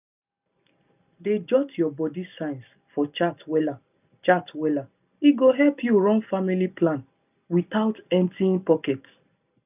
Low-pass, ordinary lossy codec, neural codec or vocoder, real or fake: 3.6 kHz; none; none; real